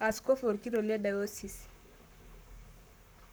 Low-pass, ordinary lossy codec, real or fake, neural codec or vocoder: none; none; fake; codec, 44.1 kHz, 7.8 kbps, Pupu-Codec